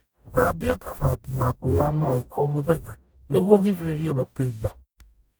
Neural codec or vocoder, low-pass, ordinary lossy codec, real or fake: codec, 44.1 kHz, 0.9 kbps, DAC; none; none; fake